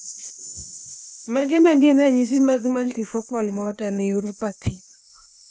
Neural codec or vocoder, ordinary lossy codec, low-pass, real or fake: codec, 16 kHz, 0.8 kbps, ZipCodec; none; none; fake